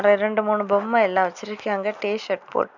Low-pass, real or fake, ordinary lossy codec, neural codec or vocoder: 7.2 kHz; real; none; none